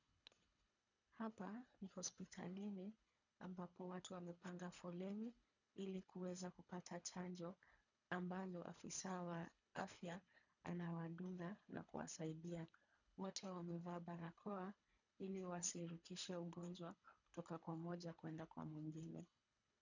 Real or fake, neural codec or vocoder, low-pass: fake; codec, 24 kHz, 3 kbps, HILCodec; 7.2 kHz